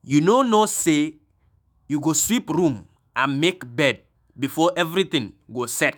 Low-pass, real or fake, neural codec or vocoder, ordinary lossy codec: none; fake; autoencoder, 48 kHz, 128 numbers a frame, DAC-VAE, trained on Japanese speech; none